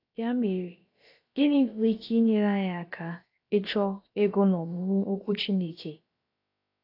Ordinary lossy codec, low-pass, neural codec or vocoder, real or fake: AAC, 32 kbps; 5.4 kHz; codec, 16 kHz, about 1 kbps, DyCAST, with the encoder's durations; fake